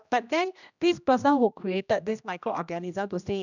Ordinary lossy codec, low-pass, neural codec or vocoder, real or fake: none; 7.2 kHz; codec, 16 kHz, 1 kbps, X-Codec, HuBERT features, trained on general audio; fake